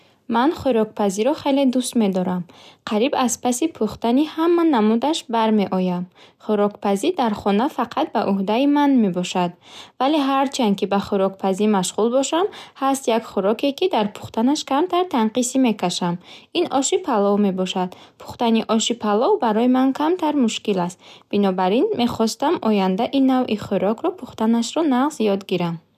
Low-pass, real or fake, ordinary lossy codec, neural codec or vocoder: 14.4 kHz; real; none; none